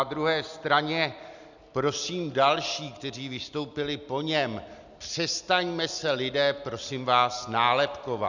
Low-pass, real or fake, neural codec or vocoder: 7.2 kHz; real; none